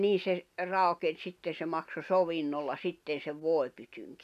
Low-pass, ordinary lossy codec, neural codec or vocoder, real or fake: 14.4 kHz; none; none; real